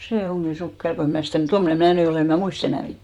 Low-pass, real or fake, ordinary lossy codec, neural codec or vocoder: 19.8 kHz; fake; MP3, 96 kbps; vocoder, 44.1 kHz, 128 mel bands, Pupu-Vocoder